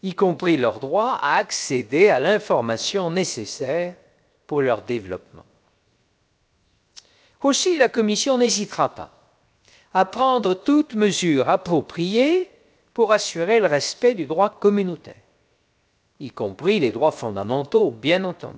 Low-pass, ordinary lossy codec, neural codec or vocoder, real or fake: none; none; codec, 16 kHz, 0.7 kbps, FocalCodec; fake